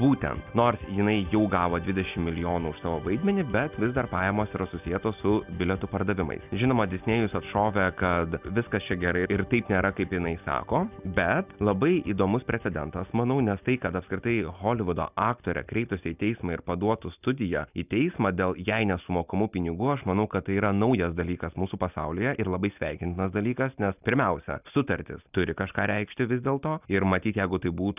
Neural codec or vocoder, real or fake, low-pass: none; real; 3.6 kHz